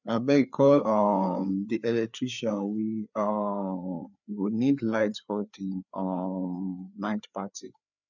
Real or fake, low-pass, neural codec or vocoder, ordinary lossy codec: fake; 7.2 kHz; codec, 16 kHz, 4 kbps, FreqCodec, larger model; none